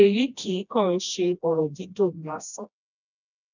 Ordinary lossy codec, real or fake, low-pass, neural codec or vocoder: none; fake; 7.2 kHz; codec, 16 kHz, 1 kbps, FreqCodec, smaller model